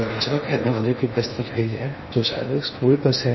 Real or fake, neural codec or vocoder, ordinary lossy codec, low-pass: fake; codec, 16 kHz in and 24 kHz out, 0.6 kbps, FocalCodec, streaming, 4096 codes; MP3, 24 kbps; 7.2 kHz